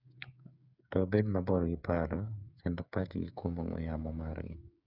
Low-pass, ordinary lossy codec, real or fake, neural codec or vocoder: 5.4 kHz; none; fake; codec, 16 kHz, 8 kbps, FreqCodec, smaller model